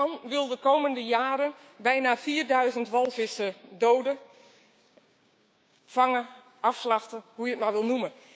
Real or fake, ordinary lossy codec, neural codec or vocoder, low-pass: fake; none; codec, 16 kHz, 6 kbps, DAC; none